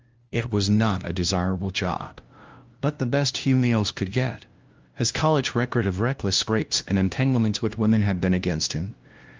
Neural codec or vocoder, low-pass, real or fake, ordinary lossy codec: codec, 16 kHz, 0.5 kbps, FunCodec, trained on LibriTTS, 25 frames a second; 7.2 kHz; fake; Opus, 24 kbps